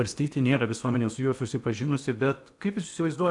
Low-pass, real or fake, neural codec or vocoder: 10.8 kHz; fake; codec, 16 kHz in and 24 kHz out, 0.8 kbps, FocalCodec, streaming, 65536 codes